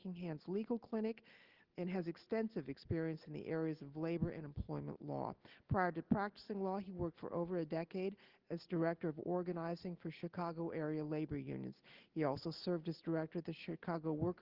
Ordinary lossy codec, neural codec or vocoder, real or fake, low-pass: Opus, 16 kbps; none; real; 5.4 kHz